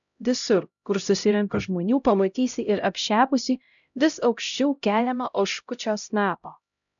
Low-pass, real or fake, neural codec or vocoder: 7.2 kHz; fake; codec, 16 kHz, 0.5 kbps, X-Codec, HuBERT features, trained on LibriSpeech